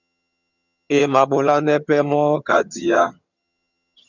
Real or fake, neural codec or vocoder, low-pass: fake; vocoder, 22.05 kHz, 80 mel bands, HiFi-GAN; 7.2 kHz